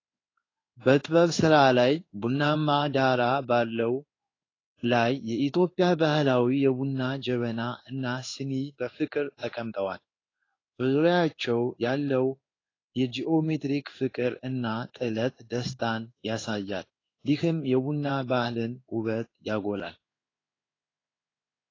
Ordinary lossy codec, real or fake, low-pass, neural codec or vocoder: AAC, 32 kbps; fake; 7.2 kHz; codec, 16 kHz in and 24 kHz out, 1 kbps, XY-Tokenizer